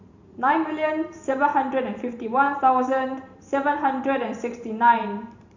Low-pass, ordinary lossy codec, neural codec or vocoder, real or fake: 7.2 kHz; none; vocoder, 44.1 kHz, 128 mel bands every 512 samples, BigVGAN v2; fake